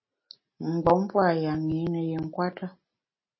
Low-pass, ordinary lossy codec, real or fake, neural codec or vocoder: 7.2 kHz; MP3, 24 kbps; real; none